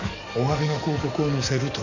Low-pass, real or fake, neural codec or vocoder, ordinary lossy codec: 7.2 kHz; fake; codec, 44.1 kHz, 7.8 kbps, DAC; none